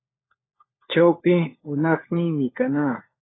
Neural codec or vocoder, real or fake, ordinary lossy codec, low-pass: codec, 16 kHz, 4 kbps, FunCodec, trained on LibriTTS, 50 frames a second; fake; AAC, 16 kbps; 7.2 kHz